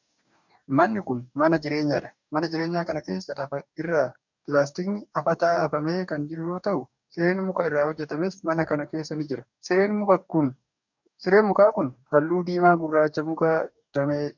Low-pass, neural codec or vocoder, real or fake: 7.2 kHz; codec, 44.1 kHz, 2.6 kbps, DAC; fake